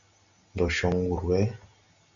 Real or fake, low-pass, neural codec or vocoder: real; 7.2 kHz; none